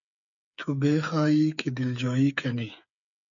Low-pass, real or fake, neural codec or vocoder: 7.2 kHz; fake; codec, 16 kHz, 8 kbps, FreqCodec, smaller model